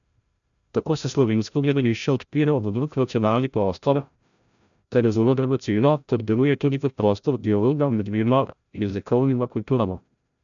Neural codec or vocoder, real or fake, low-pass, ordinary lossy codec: codec, 16 kHz, 0.5 kbps, FreqCodec, larger model; fake; 7.2 kHz; none